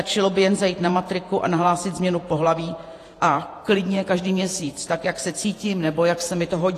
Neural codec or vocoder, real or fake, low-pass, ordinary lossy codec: vocoder, 48 kHz, 128 mel bands, Vocos; fake; 14.4 kHz; AAC, 48 kbps